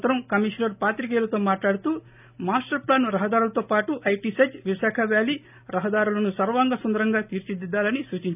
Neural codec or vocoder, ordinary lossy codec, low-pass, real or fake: none; none; 3.6 kHz; real